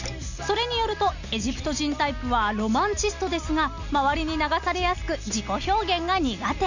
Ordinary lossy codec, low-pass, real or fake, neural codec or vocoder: none; 7.2 kHz; real; none